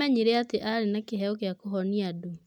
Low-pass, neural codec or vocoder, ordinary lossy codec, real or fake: 19.8 kHz; none; none; real